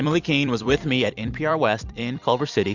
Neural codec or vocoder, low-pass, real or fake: vocoder, 44.1 kHz, 128 mel bands every 256 samples, BigVGAN v2; 7.2 kHz; fake